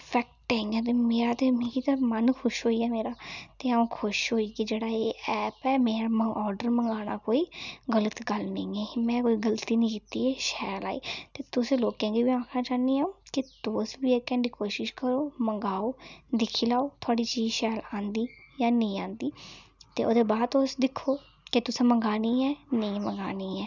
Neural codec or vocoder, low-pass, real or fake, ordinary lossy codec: none; 7.2 kHz; real; none